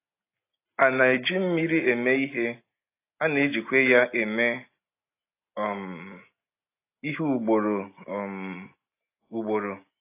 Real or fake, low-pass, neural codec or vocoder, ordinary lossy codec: real; 3.6 kHz; none; AAC, 24 kbps